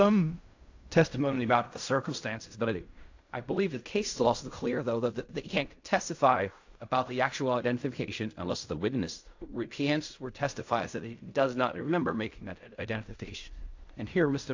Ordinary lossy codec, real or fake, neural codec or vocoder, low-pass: AAC, 48 kbps; fake; codec, 16 kHz in and 24 kHz out, 0.4 kbps, LongCat-Audio-Codec, fine tuned four codebook decoder; 7.2 kHz